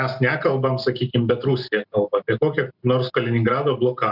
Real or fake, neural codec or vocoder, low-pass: real; none; 5.4 kHz